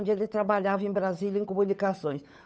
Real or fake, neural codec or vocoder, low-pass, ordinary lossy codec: fake; codec, 16 kHz, 8 kbps, FunCodec, trained on Chinese and English, 25 frames a second; none; none